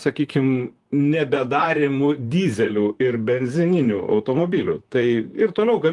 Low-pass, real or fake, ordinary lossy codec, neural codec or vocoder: 10.8 kHz; fake; Opus, 24 kbps; vocoder, 44.1 kHz, 128 mel bands, Pupu-Vocoder